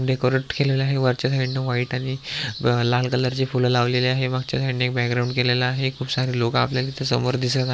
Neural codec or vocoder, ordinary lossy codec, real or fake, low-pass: none; none; real; none